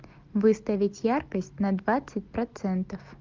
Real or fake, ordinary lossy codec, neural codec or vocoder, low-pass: real; Opus, 24 kbps; none; 7.2 kHz